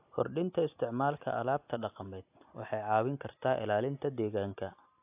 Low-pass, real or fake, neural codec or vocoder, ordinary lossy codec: 3.6 kHz; real; none; AAC, 32 kbps